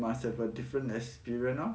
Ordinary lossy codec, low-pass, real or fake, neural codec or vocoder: none; none; real; none